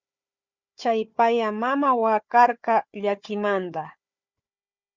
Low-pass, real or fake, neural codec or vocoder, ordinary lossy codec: 7.2 kHz; fake; codec, 16 kHz, 4 kbps, FunCodec, trained on Chinese and English, 50 frames a second; Opus, 64 kbps